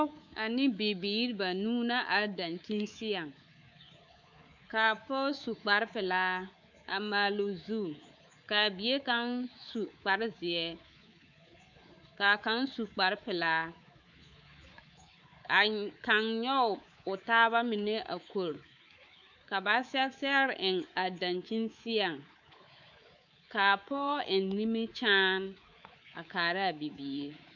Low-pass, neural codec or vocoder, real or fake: 7.2 kHz; codec, 24 kHz, 3.1 kbps, DualCodec; fake